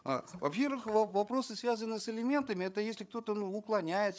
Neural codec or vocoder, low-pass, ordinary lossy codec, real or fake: codec, 16 kHz, 8 kbps, FreqCodec, larger model; none; none; fake